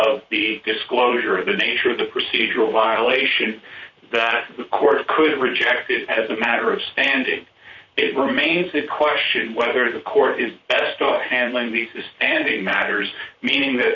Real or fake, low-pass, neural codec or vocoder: fake; 7.2 kHz; vocoder, 44.1 kHz, 128 mel bands, Pupu-Vocoder